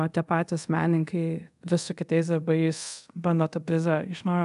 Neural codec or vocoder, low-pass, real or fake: codec, 24 kHz, 0.5 kbps, DualCodec; 10.8 kHz; fake